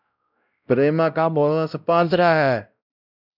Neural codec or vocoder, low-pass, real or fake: codec, 16 kHz, 0.5 kbps, X-Codec, WavLM features, trained on Multilingual LibriSpeech; 5.4 kHz; fake